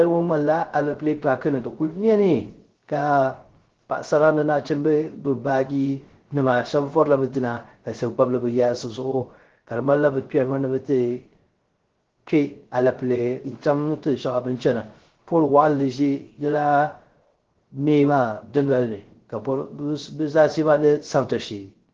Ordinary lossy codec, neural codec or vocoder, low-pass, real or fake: Opus, 16 kbps; codec, 16 kHz, 0.3 kbps, FocalCodec; 7.2 kHz; fake